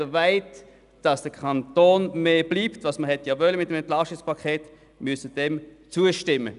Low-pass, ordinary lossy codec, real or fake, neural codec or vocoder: 10.8 kHz; none; real; none